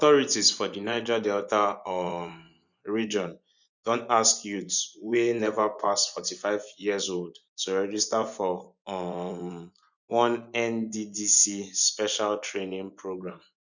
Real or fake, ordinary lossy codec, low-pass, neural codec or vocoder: fake; none; 7.2 kHz; vocoder, 24 kHz, 100 mel bands, Vocos